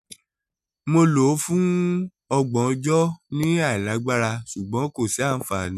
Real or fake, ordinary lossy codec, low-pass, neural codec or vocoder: real; none; 14.4 kHz; none